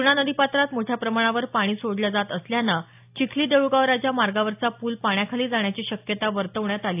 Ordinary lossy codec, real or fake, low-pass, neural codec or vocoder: AAC, 32 kbps; real; 3.6 kHz; none